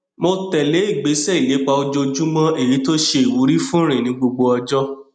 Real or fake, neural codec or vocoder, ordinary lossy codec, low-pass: real; none; none; 9.9 kHz